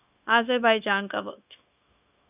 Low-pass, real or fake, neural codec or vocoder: 3.6 kHz; fake; codec, 16 kHz, 0.9 kbps, LongCat-Audio-Codec